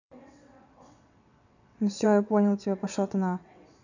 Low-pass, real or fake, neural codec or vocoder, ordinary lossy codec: 7.2 kHz; fake; vocoder, 22.05 kHz, 80 mel bands, WaveNeXt; none